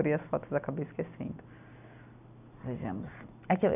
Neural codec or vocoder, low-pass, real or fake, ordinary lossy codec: none; 3.6 kHz; real; none